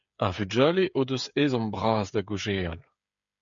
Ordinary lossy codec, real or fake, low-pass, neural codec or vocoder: MP3, 64 kbps; fake; 7.2 kHz; codec, 16 kHz, 8 kbps, FreqCodec, smaller model